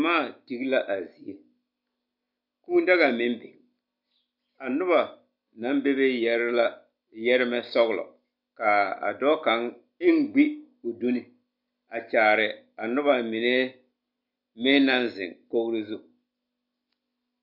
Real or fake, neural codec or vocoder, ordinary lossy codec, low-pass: real; none; MP3, 48 kbps; 5.4 kHz